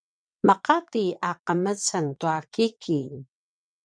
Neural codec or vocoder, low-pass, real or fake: vocoder, 22.05 kHz, 80 mel bands, WaveNeXt; 9.9 kHz; fake